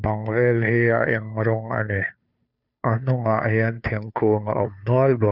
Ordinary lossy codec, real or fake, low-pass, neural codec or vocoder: none; fake; 5.4 kHz; codec, 16 kHz, 2 kbps, FunCodec, trained on Chinese and English, 25 frames a second